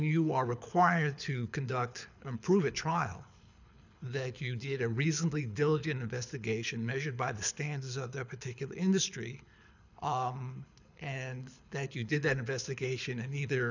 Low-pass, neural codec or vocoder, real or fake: 7.2 kHz; codec, 24 kHz, 6 kbps, HILCodec; fake